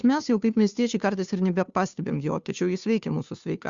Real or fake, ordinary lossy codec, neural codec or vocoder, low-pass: fake; Opus, 64 kbps; codec, 16 kHz, 2 kbps, FunCodec, trained on Chinese and English, 25 frames a second; 7.2 kHz